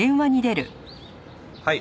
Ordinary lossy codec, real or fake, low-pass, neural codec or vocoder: none; real; none; none